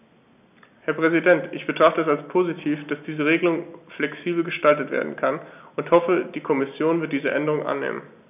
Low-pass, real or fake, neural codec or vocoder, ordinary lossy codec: 3.6 kHz; real; none; none